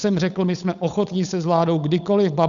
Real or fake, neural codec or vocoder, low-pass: fake; codec, 16 kHz, 8 kbps, FunCodec, trained on Chinese and English, 25 frames a second; 7.2 kHz